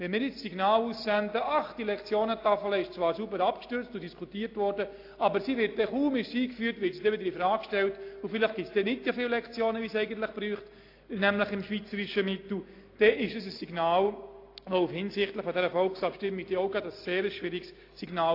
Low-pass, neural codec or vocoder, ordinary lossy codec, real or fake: 5.4 kHz; none; AAC, 32 kbps; real